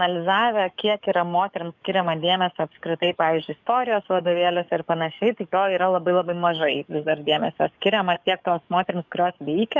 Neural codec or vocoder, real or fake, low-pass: codec, 44.1 kHz, 7.8 kbps, DAC; fake; 7.2 kHz